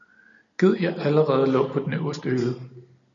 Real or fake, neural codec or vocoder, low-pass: real; none; 7.2 kHz